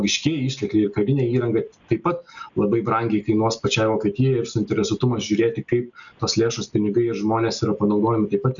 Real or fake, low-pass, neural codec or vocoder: real; 7.2 kHz; none